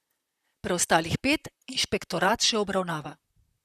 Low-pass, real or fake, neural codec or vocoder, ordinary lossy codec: 14.4 kHz; fake; vocoder, 44.1 kHz, 128 mel bands, Pupu-Vocoder; Opus, 64 kbps